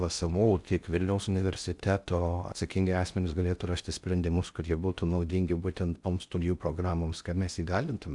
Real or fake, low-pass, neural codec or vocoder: fake; 10.8 kHz; codec, 16 kHz in and 24 kHz out, 0.6 kbps, FocalCodec, streaming, 2048 codes